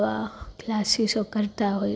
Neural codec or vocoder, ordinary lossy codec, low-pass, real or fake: none; none; none; real